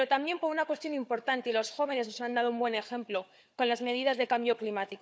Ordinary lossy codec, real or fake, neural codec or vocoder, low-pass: none; fake; codec, 16 kHz, 4 kbps, FunCodec, trained on Chinese and English, 50 frames a second; none